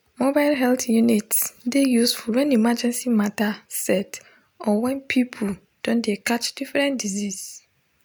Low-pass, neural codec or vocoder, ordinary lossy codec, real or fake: none; none; none; real